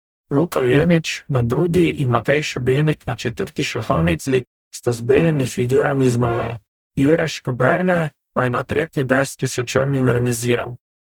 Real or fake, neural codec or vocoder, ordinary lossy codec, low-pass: fake; codec, 44.1 kHz, 0.9 kbps, DAC; none; 19.8 kHz